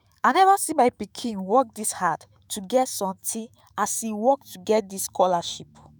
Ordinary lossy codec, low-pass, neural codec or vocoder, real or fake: none; none; autoencoder, 48 kHz, 128 numbers a frame, DAC-VAE, trained on Japanese speech; fake